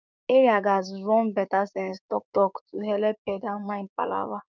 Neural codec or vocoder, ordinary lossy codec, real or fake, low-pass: codec, 16 kHz, 6 kbps, DAC; none; fake; 7.2 kHz